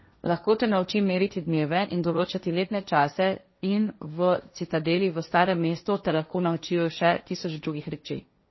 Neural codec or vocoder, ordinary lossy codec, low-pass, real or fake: codec, 16 kHz, 1.1 kbps, Voila-Tokenizer; MP3, 24 kbps; 7.2 kHz; fake